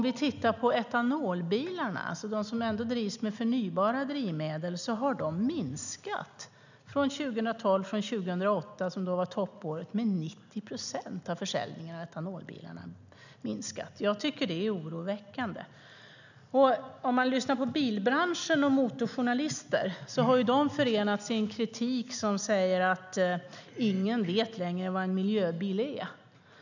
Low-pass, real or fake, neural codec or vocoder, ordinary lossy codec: 7.2 kHz; real; none; none